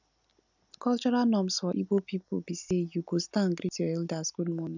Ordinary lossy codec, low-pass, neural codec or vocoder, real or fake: none; 7.2 kHz; none; real